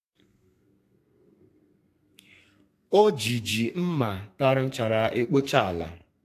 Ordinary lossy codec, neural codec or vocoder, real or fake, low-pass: AAC, 64 kbps; codec, 32 kHz, 1.9 kbps, SNAC; fake; 14.4 kHz